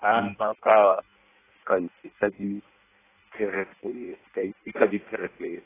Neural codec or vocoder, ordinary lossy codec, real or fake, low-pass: codec, 16 kHz in and 24 kHz out, 0.6 kbps, FireRedTTS-2 codec; AAC, 16 kbps; fake; 3.6 kHz